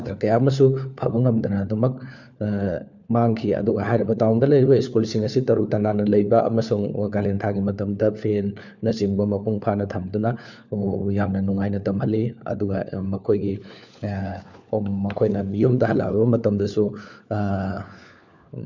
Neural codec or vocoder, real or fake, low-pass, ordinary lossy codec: codec, 16 kHz, 4 kbps, FunCodec, trained on LibriTTS, 50 frames a second; fake; 7.2 kHz; none